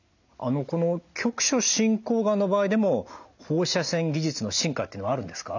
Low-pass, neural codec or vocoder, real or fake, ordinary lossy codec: 7.2 kHz; none; real; none